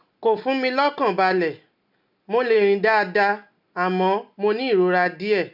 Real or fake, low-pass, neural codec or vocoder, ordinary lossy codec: real; 5.4 kHz; none; none